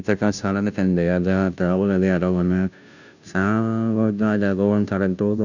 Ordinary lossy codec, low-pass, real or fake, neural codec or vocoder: none; 7.2 kHz; fake; codec, 16 kHz, 0.5 kbps, FunCodec, trained on Chinese and English, 25 frames a second